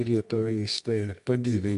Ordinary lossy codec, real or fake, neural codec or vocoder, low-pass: MP3, 64 kbps; fake; codec, 24 kHz, 0.9 kbps, WavTokenizer, medium music audio release; 10.8 kHz